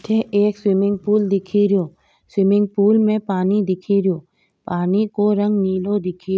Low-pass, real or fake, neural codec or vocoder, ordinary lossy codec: none; real; none; none